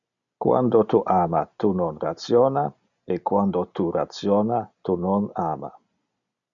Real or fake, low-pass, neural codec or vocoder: real; 7.2 kHz; none